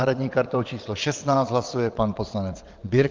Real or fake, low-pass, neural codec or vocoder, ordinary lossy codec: fake; 7.2 kHz; vocoder, 22.05 kHz, 80 mel bands, WaveNeXt; Opus, 32 kbps